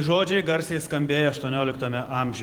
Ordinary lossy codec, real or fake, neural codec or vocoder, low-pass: Opus, 24 kbps; fake; vocoder, 44.1 kHz, 128 mel bands every 512 samples, BigVGAN v2; 19.8 kHz